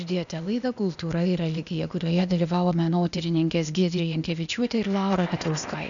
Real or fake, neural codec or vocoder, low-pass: fake; codec, 16 kHz, 0.8 kbps, ZipCodec; 7.2 kHz